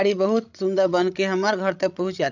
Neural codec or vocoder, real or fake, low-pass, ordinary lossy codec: codec, 16 kHz, 16 kbps, FreqCodec, larger model; fake; 7.2 kHz; none